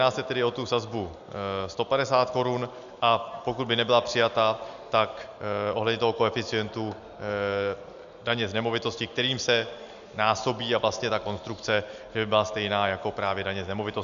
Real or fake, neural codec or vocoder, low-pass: real; none; 7.2 kHz